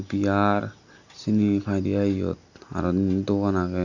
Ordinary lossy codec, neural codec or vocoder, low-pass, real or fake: none; none; 7.2 kHz; real